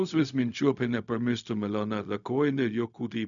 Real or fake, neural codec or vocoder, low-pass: fake; codec, 16 kHz, 0.4 kbps, LongCat-Audio-Codec; 7.2 kHz